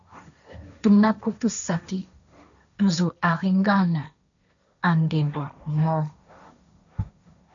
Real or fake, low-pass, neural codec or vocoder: fake; 7.2 kHz; codec, 16 kHz, 1.1 kbps, Voila-Tokenizer